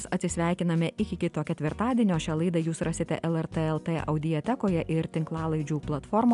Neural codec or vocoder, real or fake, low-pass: none; real; 10.8 kHz